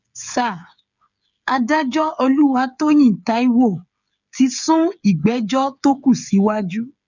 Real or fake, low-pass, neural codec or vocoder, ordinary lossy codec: fake; 7.2 kHz; codec, 16 kHz, 8 kbps, FreqCodec, smaller model; none